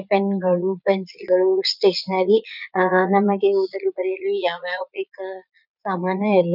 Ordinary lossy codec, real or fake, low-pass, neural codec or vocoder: none; fake; 5.4 kHz; vocoder, 22.05 kHz, 80 mel bands, Vocos